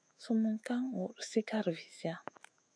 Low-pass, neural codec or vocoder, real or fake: 9.9 kHz; autoencoder, 48 kHz, 128 numbers a frame, DAC-VAE, trained on Japanese speech; fake